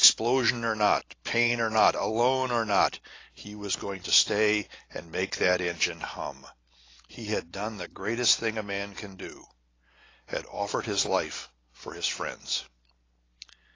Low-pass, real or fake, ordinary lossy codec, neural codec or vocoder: 7.2 kHz; real; AAC, 32 kbps; none